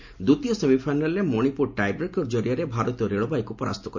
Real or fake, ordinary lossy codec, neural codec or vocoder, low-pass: real; MP3, 48 kbps; none; 7.2 kHz